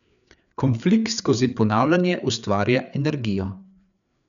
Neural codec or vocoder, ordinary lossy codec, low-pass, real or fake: codec, 16 kHz, 4 kbps, FreqCodec, larger model; none; 7.2 kHz; fake